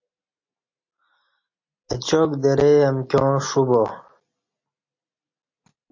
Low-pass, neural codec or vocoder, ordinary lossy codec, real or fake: 7.2 kHz; none; MP3, 32 kbps; real